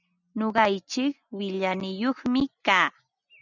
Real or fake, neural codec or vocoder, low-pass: real; none; 7.2 kHz